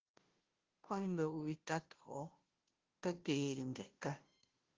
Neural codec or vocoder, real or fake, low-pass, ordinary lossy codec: codec, 16 kHz, 0.5 kbps, FunCodec, trained on Chinese and English, 25 frames a second; fake; 7.2 kHz; Opus, 16 kbps